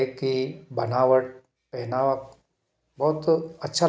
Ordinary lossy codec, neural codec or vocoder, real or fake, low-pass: none; none; real; none